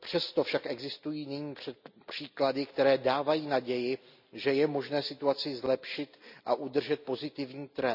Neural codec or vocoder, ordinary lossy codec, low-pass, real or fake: none; none; 5.4 kHz; real